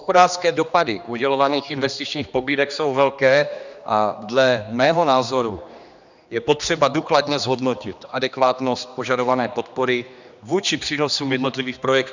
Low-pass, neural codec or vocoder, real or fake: 7.2 kHz; codec, 16 kHz, 2 kbps, X-Codec, HuBERT features, trained on general audio; fake